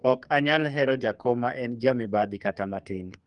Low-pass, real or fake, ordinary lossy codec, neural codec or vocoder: 10.8 kHz; fake; Opus, 32 kbps; codec, 44.1 kHz, 2.6 kbps, SNAC